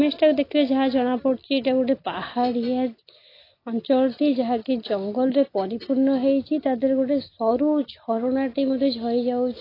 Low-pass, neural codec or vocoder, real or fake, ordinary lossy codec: 5.4 kHz; none; real; AAC, 24 kbps